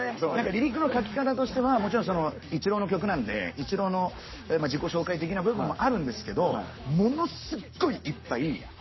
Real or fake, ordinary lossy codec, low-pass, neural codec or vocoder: fake; MP3, 24 kbps; 7.2 kHz; codec, 44.1 kHz, 7.8 kbps, Pupu-Codec